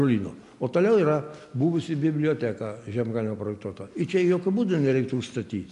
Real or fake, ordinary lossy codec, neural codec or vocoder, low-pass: real; MP3, 64 kbps; none; 10.8 kHz